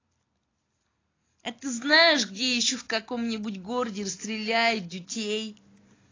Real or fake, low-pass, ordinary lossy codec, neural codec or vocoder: real; 7.2 kHz; AAC, 32 kbps; none